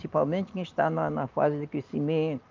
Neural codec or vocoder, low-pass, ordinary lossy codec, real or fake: none; 7.2 kHz; Opus, 32 kbps; real